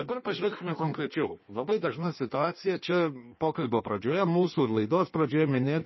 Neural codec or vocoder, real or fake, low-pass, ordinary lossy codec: codec, 16 kHz in and 24 kHz out, 1.1 kbps, FireRedTTS-2 codec; fake; 7.2 kHz; MP3, 24 kbps